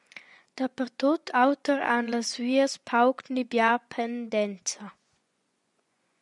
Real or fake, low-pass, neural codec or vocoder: fake; 10.8 kHz; vocoder, 24 kHz, 100 mel bands, Vocos